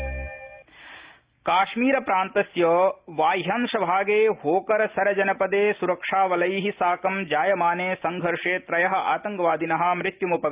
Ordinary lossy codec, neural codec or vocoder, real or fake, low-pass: Opus, 32 kbps; none; real; 3.6 kHz